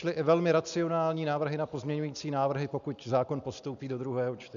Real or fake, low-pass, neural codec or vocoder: real; 7.2 kHz; none